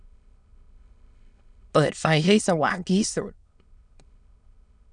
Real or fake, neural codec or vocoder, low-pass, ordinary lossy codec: fake; autoencoder, 22.05 kHz, a latent of 192 numbers a frame, VITS, trained on many speakers; 9.9 kHz; none